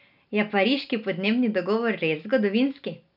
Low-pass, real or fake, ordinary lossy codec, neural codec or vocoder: 5.4 kHz; real; none; none